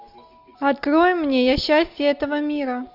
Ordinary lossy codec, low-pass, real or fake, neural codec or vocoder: Opus, 64 kbps; 5.4 kHz; real; none